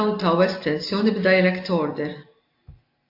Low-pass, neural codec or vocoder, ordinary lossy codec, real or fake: 5.4 kHz; none; AAC, 32 kbps; real